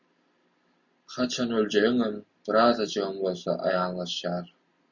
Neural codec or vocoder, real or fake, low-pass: none; real; 7.2 kHz